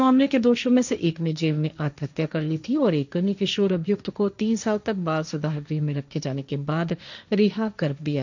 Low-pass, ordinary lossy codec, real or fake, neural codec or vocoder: 7.2 kHz; none; fake; codec, 16 kHz, 1.1 kbps, Voila-Tokenizer